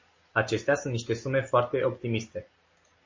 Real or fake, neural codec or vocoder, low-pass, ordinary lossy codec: real; none; 7.2 kHz; MP3, 32 kbps